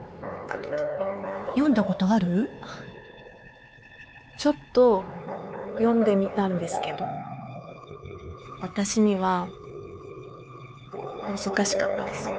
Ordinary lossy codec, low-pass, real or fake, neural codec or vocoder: none; none; fake; codec, 16 kHz, 4 kbps, X-Codec, HuBERT features, trained on LibriSpeech